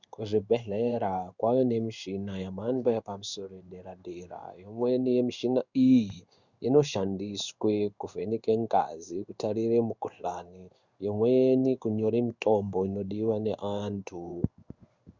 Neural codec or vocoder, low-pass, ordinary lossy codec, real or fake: codec, 16 kHz in and 24 kHz out, 1 kbps, XY-Tokenizer; 7.2 kHz; Opus, 64 kbps; fake